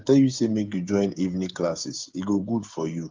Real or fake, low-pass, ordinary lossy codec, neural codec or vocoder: fake; 7.2 kHz; Opus, 32 kbps; codec, 16 kHz, 8 kbps, FreqCodec, smaller model